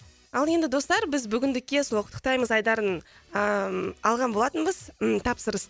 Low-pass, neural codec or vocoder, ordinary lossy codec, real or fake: none; none; none; real